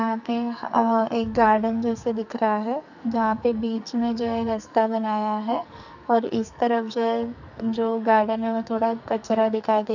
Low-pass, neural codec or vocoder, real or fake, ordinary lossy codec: 7.2 kHz; codec, 44.1 kHz, 2.6 kbps, SNAC; fake; none